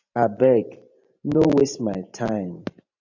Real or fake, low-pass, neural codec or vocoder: fake; 7.2 kHz; vocoder, 44.1 kHz, 128 mel bands every 512 samples, BigVGAN v2